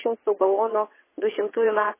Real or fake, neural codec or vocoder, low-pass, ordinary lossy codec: fake; vocoder, 22.05 kHz, 80 mel bands, WaveNeXt; 3.6 kHz; MP3, 16 kbps